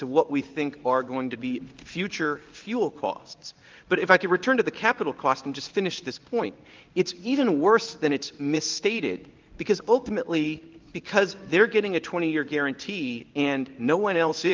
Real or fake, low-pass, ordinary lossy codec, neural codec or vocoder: fake; 7.2 kHz; Opus, 24 kbps; codec, 16 kHz in and 24 kHz out, 1 kbps, XY-Tokenizer